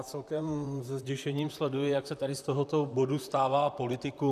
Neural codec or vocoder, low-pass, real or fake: vocoder, 44.1 kHz, 128 mel bands, Pupu-Vocoder; 14.4 kHz; fake